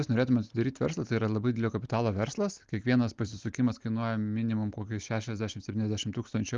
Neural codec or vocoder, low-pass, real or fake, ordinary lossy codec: none; 7.2 kHz; real; Opus, 32 kbps